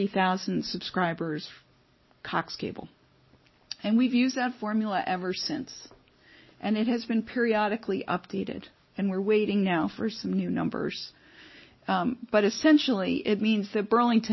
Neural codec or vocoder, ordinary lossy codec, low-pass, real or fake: none; MP3, 24 kbps; 7.2 kHz; real